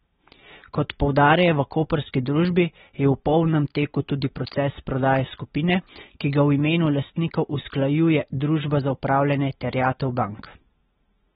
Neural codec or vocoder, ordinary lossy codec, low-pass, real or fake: none; AAC, 16 kbps; 19.8 kHz; real